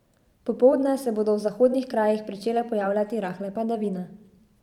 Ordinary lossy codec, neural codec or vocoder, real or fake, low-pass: none; vocoder, 44.1 kHz, 128 mel bands every 512 samples, BigVGAN v2; fake; 19.8 kHz